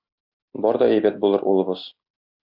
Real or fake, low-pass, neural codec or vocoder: real; 5.4 kHz; none